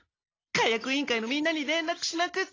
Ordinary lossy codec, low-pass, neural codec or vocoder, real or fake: AAC, 32 kbps; 7.2 kHz; none; real